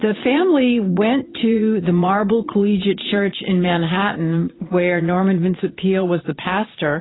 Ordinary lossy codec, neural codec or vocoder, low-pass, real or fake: AAC, 16 kbps; vocoder, 22.05 kHz, 80 mel bands, Vocos; 7.2 kHz; fake